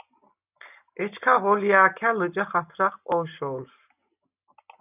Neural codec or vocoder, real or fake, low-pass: none; real; 3.6 kHz